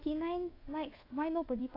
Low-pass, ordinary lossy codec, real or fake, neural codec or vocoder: 5.4 kHz; AAC, 24 kbps; fake; codec, 24 kHz, 1.2 kbps, DualCodec